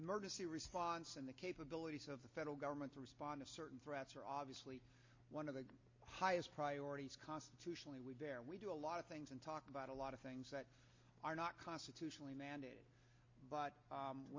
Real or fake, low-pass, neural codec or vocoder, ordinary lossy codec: real; 7.2 kHz; none; MP3, 32 kbps